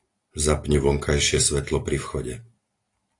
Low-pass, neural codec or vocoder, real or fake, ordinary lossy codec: 10.8 kHz; none; real; AAC, 48 kbps